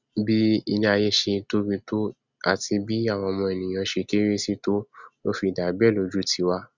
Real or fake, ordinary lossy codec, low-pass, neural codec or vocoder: real; Opus, 64 kbps; 7.2 kHz; none